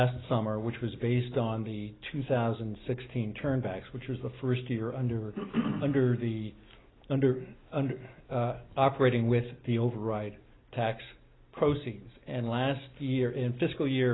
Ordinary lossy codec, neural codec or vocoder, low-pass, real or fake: AAC, 16 kbps; none; 7.2 kHz; real